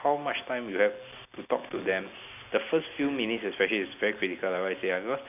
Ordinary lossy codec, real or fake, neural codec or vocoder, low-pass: none; real; none; 3.6 kHz